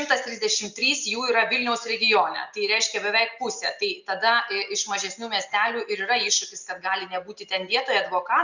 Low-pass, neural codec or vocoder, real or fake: 7.2 kHz; none; real